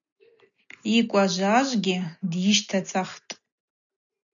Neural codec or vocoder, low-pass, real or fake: none; 7.2 kHz; real